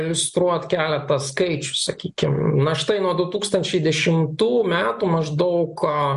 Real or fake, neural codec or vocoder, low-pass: real; none; 10.8 kHz